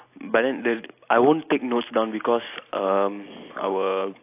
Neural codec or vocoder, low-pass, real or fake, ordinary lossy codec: none; 3.6 kHz; real; AAC, 24 kbps